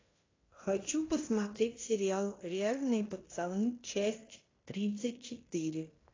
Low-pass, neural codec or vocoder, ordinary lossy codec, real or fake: 7.2 kHz; codec, 16 kHz in and 24 kHz out, 0.9 kbps, LongCat-Audio-Codec, fine tuned four codebook decoder; AAC, 32 kbps; fake